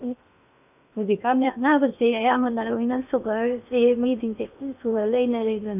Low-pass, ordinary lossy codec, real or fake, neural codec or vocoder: 3.6 kHz; none; fake; codec, 16 kHz in and 24 kHz out, 0.8 kbps, FocalCodec, streaming, 65536 codes